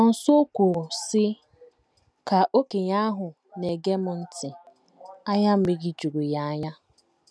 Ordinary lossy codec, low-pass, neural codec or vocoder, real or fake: none; none; none; real